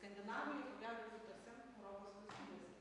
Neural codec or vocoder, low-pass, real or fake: vocoder, 44.1 kHz, 128 mel bands every 256 samples, BigVGAN v2; 10.8 kHz; fake